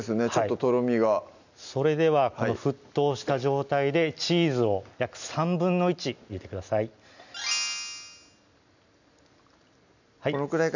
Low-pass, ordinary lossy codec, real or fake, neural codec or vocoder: 7.2 kHz; none; real; none